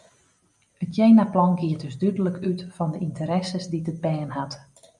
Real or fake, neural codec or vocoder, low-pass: real; none; 10.8 kHz